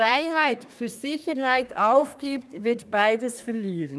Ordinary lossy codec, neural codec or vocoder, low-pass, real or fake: none; codec, 24 kHz, 1 kbps, SNAC; none; fake